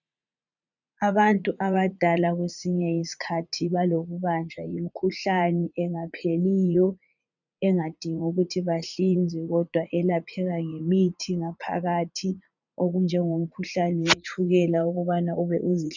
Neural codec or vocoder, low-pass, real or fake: vocoder, 44.1 kHz, 80 mel bands, Vocos; 7.2 kHz; fake